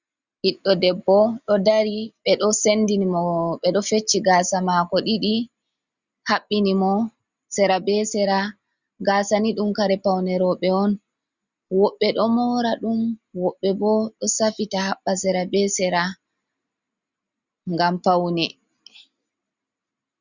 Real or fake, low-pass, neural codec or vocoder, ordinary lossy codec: real; 7.2 kHz; none; Opus, 64 kbps